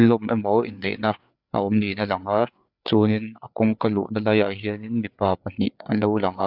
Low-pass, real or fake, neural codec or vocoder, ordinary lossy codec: 5.4 kHz; fake; codec, 16 kHz, 4 kbps, FreqCodec, larger model; none